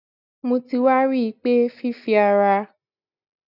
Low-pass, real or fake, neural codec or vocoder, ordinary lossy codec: 5.4 kHz; real; none; none